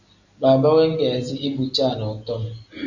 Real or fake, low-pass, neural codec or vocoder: real; 7.2 kHz; none